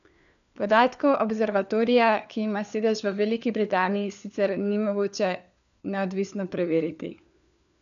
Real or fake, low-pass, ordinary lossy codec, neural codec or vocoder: fake; 7.2 kHz; none; codec, 16 kHz, 4 kbps, FunCodec, trained on LibriTTS, 50 frames a second